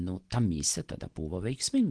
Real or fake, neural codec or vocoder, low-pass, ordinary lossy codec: real; none; 9.9 kHz; Opus, 16 kbps